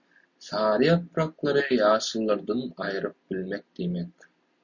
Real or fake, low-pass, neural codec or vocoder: real; 7.2 kHz; none